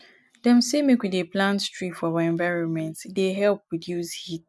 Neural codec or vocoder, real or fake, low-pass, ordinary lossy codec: none; real; none; none